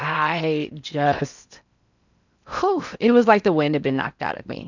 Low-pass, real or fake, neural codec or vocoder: 7.2 kHz; fake; codec, 16 kHz in and 24 kHz out, 0.8 kbps, FocalCodec, streaming, 65536 codes